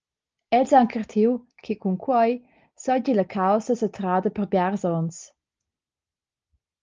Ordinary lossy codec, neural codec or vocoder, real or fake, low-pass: Opus, 24 kbps; none; real; 7.2 kHz